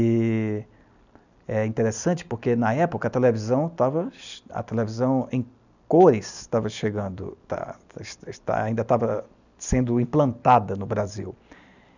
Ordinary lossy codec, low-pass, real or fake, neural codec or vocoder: none; 7.2 kHz; real; none